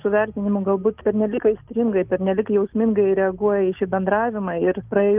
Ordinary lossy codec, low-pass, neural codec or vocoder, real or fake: Opus, 24 kbps; 3.6 kHz; none; real